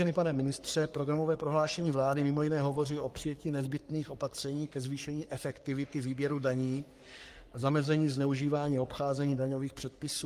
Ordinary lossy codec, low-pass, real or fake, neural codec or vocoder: Opus, 32 kbps; 14.4 kHz; fake; codec, 44.1 kHz, 3.4 kbps, Pupu-Codec